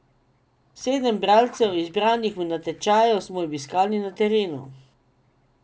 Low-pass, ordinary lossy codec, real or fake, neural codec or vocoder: none; none; real; none